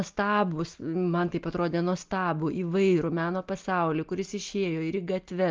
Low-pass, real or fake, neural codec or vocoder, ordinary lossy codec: 7.2 kHz; real; none; Opus, 32 kbps